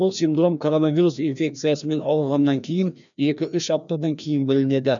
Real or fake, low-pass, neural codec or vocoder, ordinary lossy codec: fake; 7.2 kHz; codec, 16 kHz, 1 kbps, FreqCodec, larger model; none